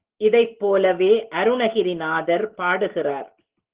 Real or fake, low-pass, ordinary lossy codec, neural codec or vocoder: real; 3.6 kHz; Opus, 16 kbps; none